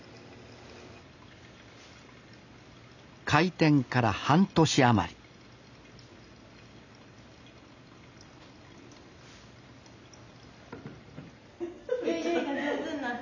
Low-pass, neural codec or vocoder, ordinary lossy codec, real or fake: 7.2 kHz; none; none; real